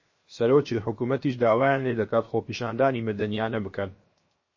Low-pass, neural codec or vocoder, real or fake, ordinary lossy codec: 7.2 kHz; codec, 16 kHz, 0.7 kbps, FocalCodec; fake; MP3, 32 kbps